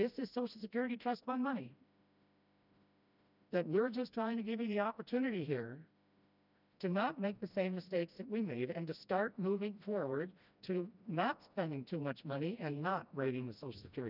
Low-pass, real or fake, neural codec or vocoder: 5.4 kHz; fake; codec, 16 kHz, 1 kbps, FreqCodec, smaller model